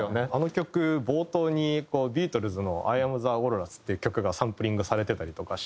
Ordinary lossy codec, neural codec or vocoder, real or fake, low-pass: none; none; real; none